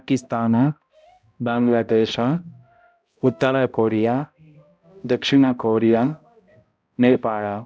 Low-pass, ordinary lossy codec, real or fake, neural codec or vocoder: none; none; fake; codec, 16 kHz, 0.5 kbps, X-Codec, HuBERT features, trained on balanced general audio